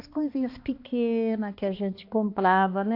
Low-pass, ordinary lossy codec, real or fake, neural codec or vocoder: 5.4 kHz; AAC, 32 kbps; fake; codec, 16 kHz, 2 kbps, X-Codec, HuBERT features, trained on balanced general audio